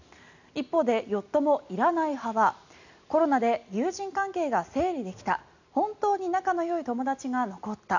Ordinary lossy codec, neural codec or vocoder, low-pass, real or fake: none; none; 7.2 kHz; real